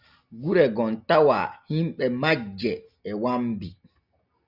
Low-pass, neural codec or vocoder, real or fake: 5.4 kHz; none; real